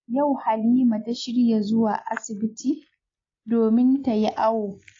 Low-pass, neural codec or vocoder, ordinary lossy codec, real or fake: 7.2 kHz; none; AAC, 32 kbps; real